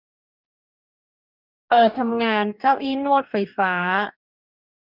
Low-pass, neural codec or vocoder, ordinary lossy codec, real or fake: 5.4 kHz; codec, 44.1 kHz, 2.6 kbps, DAC; none; fake